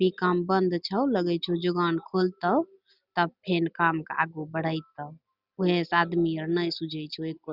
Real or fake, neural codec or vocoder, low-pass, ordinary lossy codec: real; none; 5.4 kHz; Opus, 64 kbps